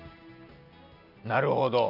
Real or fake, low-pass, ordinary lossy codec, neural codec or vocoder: real; 5.4 kHz; none; none